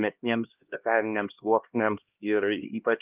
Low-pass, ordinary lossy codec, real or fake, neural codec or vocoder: 3.6 kHz; Opus, 24 kbps; fake; codec, 16 kHz, 1 kbps, X-Codec, HuBERT features, trained on LibriSpeech